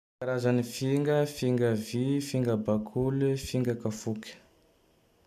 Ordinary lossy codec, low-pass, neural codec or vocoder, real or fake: none; 14.4 kHz; none; real